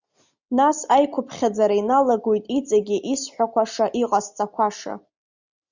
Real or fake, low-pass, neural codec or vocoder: real; 7.2 kHz; none